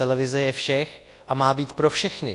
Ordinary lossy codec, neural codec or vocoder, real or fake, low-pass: AAC, 48 kbps; codec, 24 kHz, 0.9 kbps, WavTokenizer, large speech release; fake; 10.8 kHz